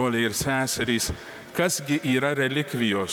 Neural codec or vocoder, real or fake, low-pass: vocoder, 44.1 kHz, 128 mel bands, Pupu-Vocoder; fake; 19.8 kHz